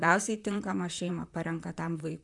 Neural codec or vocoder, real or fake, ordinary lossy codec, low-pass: vocoder, 44.1 kHz, 128 mel bands, Pupu-Vocoder; fake; MP3, 96 kbps; 10.8 kHz